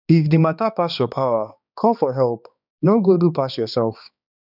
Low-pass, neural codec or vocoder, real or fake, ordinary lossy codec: 5.4 kHz; codec, 16 kHz, 2 kbps, X-Codec, HuBERT features, trained on balanced general audio; fake; none